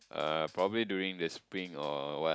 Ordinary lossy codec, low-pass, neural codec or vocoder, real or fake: none; none; none; real